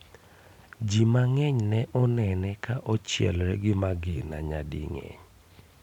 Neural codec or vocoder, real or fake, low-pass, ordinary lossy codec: none; real; 19.8 kHz; none